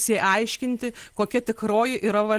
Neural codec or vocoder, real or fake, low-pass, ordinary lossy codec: none; real; 14.4 kHz; Opus, 16 kbps